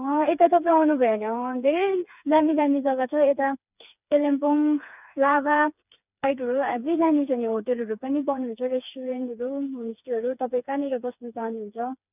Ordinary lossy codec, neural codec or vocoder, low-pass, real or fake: none; codec, 16 kHz, 4 kbps, FreqCodec, smaller model; 3.6 kHz; fake